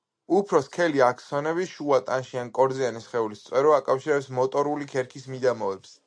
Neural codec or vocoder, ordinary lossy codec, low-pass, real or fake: none; MP3, 64 kbps; 9.9 kHz; real